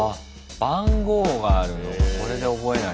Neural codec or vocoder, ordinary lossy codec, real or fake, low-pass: none; none; real; none